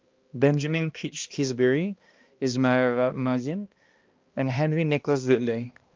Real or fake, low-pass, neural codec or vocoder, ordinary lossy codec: fake; 7.2 kHz; codec, 16 kHz, 1 kbps, X-Codec, HuBERT features, trained on balanced general audio; Opus, 24 kbps